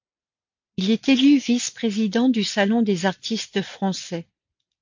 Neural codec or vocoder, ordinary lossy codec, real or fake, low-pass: none; MP3, 48 kbps; real; 7.2 kHz